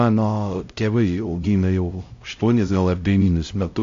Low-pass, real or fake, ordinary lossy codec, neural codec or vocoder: 7.2 kHz; fake; AAC, 48 kbps; codec, 16 kHz, 0.5 kbps, X-Codec, HuBERT features, trained on LibriSpeech